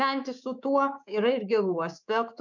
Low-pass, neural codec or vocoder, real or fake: 7.2 kHz; codec, 24 kHz, 3.1 kbps, DualCodec; fake